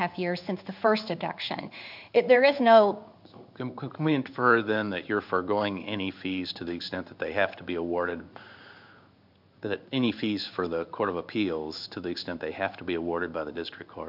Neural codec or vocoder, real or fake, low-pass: codec, 16 kHz in and 24 kHz out, 1 kbps, XY-Tokenizer; fake; 5.4 kHz